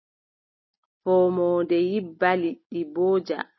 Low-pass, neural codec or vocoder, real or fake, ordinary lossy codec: 7.2 kHz; none; real; MP3, 24 kbps